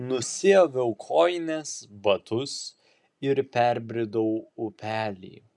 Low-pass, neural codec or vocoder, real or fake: 10.8 kHz; none; real